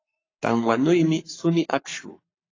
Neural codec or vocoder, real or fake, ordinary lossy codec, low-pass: codec, 44.1 kHz, 7.8 kbps, Pupu-Codec; fake; AAC, 32 kbps; 7.2 kHz